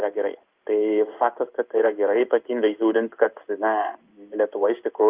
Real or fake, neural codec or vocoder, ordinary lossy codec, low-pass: fake; codec, 16 kHz in and 24 kHz out, 1 kbps, XY-Tokenizer; Opus, 24 kbps; 3.6 kHz